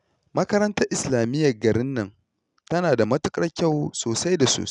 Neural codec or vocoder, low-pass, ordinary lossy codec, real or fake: none; 10.8 kHz; none; real